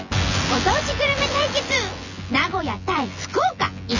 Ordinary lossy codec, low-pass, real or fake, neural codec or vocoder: none; 7.2 kHz; fake; vocoder, 24 kHz, 100 mel bands, Vocos